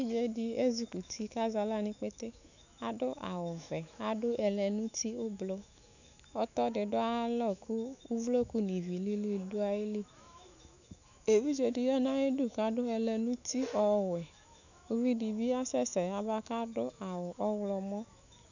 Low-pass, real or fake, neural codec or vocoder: 7.2 kHz; fake; autoencoder, 48 kHz, 128 numbers a frame, DAC-VAE, trained on Japanese speech